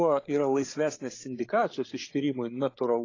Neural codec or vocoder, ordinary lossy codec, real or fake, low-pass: codec, 16 kHz, 8 kbps, FreqCodec, larger model; AAC, 32 kbps; fake; 7.2 kHz